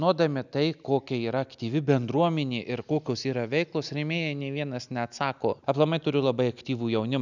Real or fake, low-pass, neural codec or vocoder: real; 7.2 kHz; none